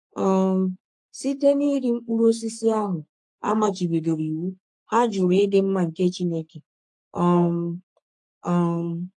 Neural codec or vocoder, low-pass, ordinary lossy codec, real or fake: codec, 44.1 kHz, 3.4 kbps, Pupu-Codec; 10.8 kHz; AAC, 64 kbps; fake